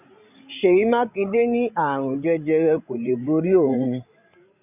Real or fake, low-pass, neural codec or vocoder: fake; 3.6 kHz; codec, 16 kHz, 16 kbps, FreqCodec, larger model